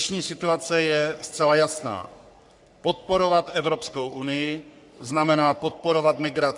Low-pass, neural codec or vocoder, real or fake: 10.8 kHz; codec, 44.1 kHz, 3.4 kbps, Pupu-Codec; fake